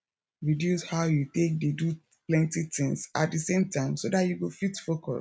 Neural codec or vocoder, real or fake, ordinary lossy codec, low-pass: none; real; none; none